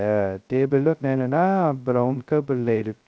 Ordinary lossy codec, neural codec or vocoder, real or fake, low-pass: none; codec, 16 kHz, 0.2 kbps, FocalCodec; fake; none